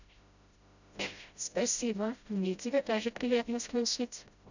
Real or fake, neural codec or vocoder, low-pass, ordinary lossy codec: fake; codec, 16 kHz, 0.5 kbps, FreqCodec, smaller model; 7.2 kHz; none